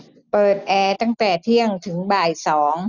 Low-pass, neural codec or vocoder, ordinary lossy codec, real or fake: 7.2 kHz; none; none; real